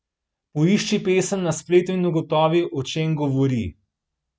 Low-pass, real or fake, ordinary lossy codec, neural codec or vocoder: none; real; none; none